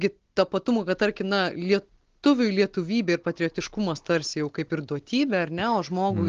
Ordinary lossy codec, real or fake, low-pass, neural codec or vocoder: Opus, 16 kbps; real; 7.2 kHz; none